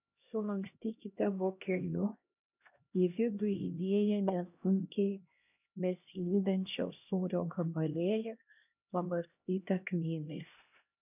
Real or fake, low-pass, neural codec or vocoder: fake; 3.6 kHz; codec, 16 kHz, 1 kbps, X-Codec, HuBERT features, trained on LibriSpeech